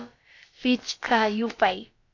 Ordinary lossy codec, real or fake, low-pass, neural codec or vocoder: AAC, 48 kbps; fake; 7.2 kHz; codec, 16 kHz, about 1 kbps, DyCAST, with the encoder's durations